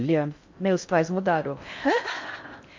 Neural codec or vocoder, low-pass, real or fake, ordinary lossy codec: codec, 16 kHz in and 24 kHz out, 0.6 kbps, FocalCodec, streaming, 4096 codes; 7.2 kHz; fake; MP3, 48 kbps